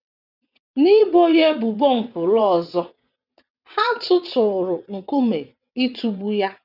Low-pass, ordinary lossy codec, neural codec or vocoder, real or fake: 5.4 kHz; none; vocoder, 22.05 kHz, 80 mel bands, WaveNeXt; fake